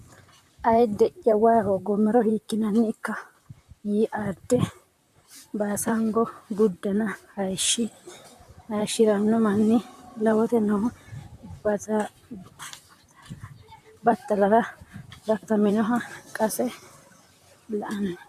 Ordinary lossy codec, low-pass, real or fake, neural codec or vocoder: AAC, 96 kbps; 14.4 kHz; fake; vocoder, 44.1 kHz, 128 mel bands, Pupu-Vocoder